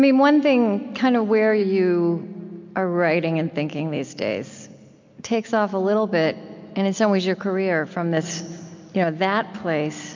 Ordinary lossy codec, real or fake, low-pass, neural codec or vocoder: MP3, 64 kbps; real; 7.2 kHz; none